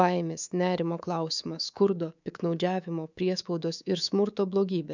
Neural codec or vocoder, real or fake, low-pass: codec, 24 kHz, 3.1 kbps, DualCodec; fake; 7.2 kHz